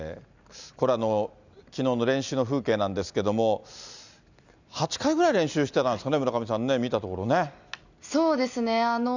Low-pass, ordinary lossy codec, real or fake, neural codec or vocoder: 7.2 kHz; none; real; none